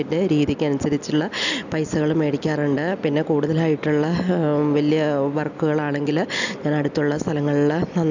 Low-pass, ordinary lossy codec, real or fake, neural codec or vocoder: 7.2 kHz; none; real; none